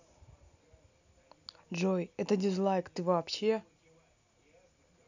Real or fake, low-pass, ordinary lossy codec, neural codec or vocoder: real; 7.2 kHz; none; none